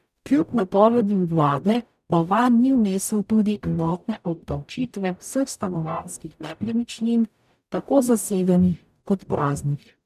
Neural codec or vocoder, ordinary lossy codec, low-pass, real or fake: codec, 44.1 kHz, 0.9 kbps, DAC; none; 14.4 kHz; fake